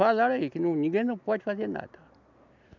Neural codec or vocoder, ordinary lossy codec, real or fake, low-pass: none; none; real; 7.2 kHz